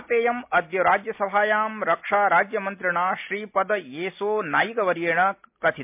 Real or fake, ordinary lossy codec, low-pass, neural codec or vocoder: real; MP3, 32 kbps; 3.6 kHz; none